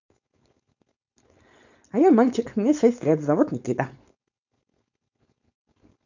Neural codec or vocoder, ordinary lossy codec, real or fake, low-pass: codec, 16 kHz, 4.8 kbps, FACodec; none; fake; 7.2 kHz